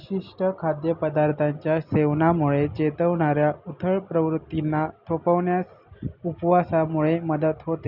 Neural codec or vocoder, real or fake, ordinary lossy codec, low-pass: none; real; none; 5.4 kHz